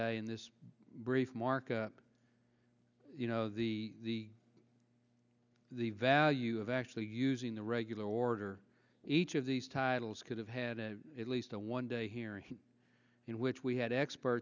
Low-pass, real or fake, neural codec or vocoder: 7.2 kHz; real; none